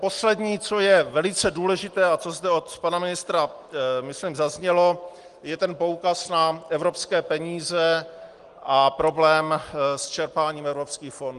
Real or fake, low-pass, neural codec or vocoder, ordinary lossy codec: real; 10.8 kHz; none; Opus, 24 kbps